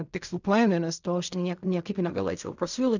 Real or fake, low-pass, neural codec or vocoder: fake; 7.2 kHz; codec, 16 kHz in and 24 kHz out, 0.4 kbps, LongCat-Audio-Codec, fine tuned four codebook decoder